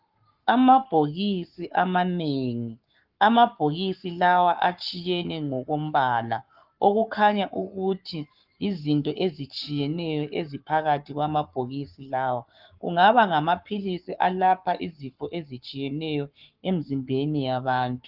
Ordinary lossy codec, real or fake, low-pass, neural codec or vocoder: Opus, 32 kbps; fake; 5.4 kHz; codec, 44.1 kHz, 7.8 kbps, Pupu-Codec